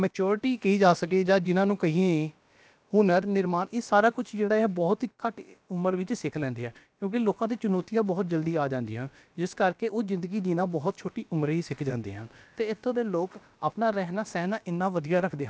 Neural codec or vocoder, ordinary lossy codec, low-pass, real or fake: codec, 16 kHz, about 1 kbps, DyCAST, with the encoder's durations; none; none; fake